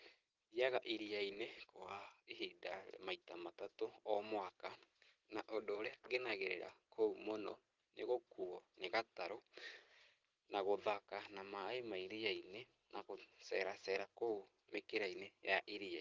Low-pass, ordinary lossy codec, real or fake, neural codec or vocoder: 7.2 kHz; Opus, 16 kbps; real; none